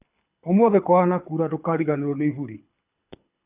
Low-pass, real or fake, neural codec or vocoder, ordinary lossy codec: 3.6 kHz; fake; codec, 24 kHz, 6 kbps, HILCodec; none